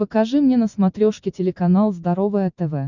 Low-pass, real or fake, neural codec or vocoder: 7.2 kHz; real; none